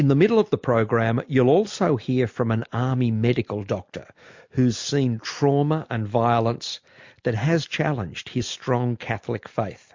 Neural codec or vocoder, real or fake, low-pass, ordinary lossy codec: none; real; 7.2 kHz; MP3, 48 kbps